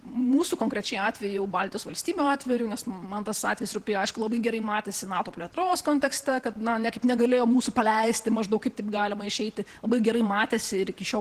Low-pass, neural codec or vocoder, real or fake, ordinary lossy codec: 14.4 kHz; vocoder, 48 kHz, 128 mel bands, Vocos; fake; Opus, 16 kbps